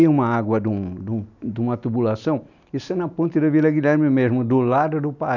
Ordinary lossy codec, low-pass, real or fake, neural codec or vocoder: none; 7.2 kHz; real; none